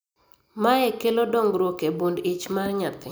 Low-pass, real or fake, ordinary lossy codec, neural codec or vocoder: none; real; none; none